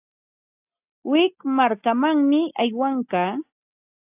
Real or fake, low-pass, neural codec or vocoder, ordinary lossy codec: real; 3.6 kHz; none; AAC, 32 kbps